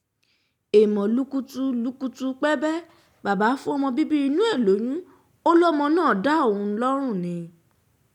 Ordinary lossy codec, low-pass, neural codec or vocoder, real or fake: none; 19.8 kHz; none; real